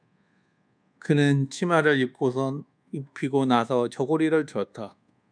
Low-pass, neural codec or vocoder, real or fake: 9.9 kHz; codec, 24 kHz, 1.2 kbps, DualCodec; fake